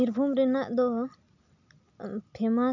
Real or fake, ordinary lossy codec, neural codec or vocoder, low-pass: real; none; none; 7.2 kHz